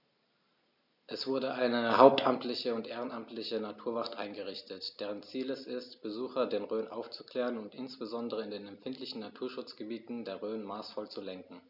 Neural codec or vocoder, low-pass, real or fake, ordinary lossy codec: none; 5.4 kHz; real; none